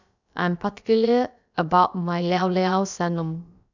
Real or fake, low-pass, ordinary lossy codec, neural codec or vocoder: fake; 7.2 kHz; none; codec, 16 kHz, about 1 kbps, DyCAST, with the encoder's durations